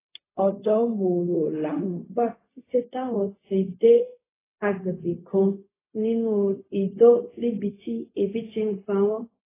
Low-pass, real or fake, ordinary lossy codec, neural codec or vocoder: 3.6 kHz; fake; AAC, 16 kbps; codec, 16 kHz, 0.4 kbps, LongCat-Audio-Codec